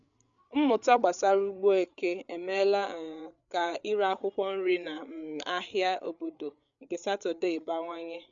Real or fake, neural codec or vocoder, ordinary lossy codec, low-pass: fake; codec, 16 kHz, 8 kbps, FreqCodec, larger model; none; 7.2 kHz